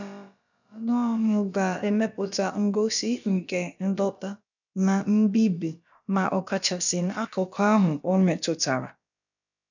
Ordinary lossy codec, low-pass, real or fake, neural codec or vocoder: none; 7.2 kHz; fake; codec, 16 kHz, about 1 kbps, DyCAST, with the encoder's durations